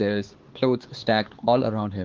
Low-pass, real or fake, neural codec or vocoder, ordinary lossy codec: 7.2 kHz; fake; codec, 16 kHz, 4 kbps, X-Codec, HuBERT features, trained on LibriSpeech; Opus, 16 kbps